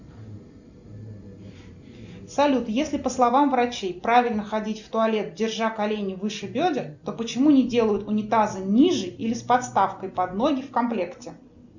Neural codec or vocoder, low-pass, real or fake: none; 7.2 kHz; real